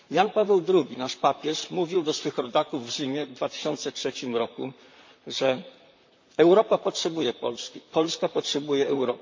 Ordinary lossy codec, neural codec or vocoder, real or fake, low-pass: MP3, 48 kbps; vocoder, 44.1 kHz, 128 mel bands, Pupu-Vocoder; fake; 7.2 kHz